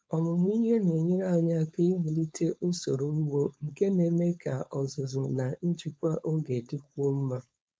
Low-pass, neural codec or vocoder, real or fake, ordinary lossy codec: none; codec, 16 kHz, 4.8 kbps, FACodec; fake; none